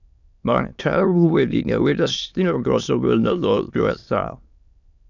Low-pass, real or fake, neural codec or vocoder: 7.2 kHz; fake; autoencoder, 22.05 kHz, a latent of 192 numbers a frame, VITS, trained on many speakers